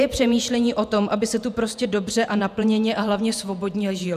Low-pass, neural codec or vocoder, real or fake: 14.4 kHz; vocoder, 48 kHz, 128 mel bands, Vocos; fake